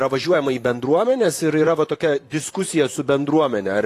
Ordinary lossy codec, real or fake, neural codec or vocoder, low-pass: AAC, 48 kbps; fake; vocoder, 44.1 kHz, 128 mel bands, Pupu-Vocoder; 14.4 kHz